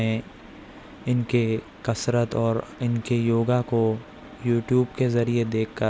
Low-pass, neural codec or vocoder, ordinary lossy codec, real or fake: none; none; none; real